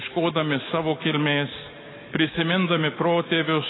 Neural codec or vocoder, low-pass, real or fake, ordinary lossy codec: none; 7.2 kHz; real; AAC, 16 kbps